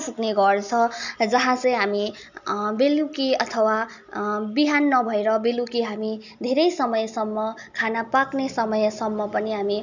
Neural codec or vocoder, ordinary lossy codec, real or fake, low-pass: none; none; real; 7.2 kHz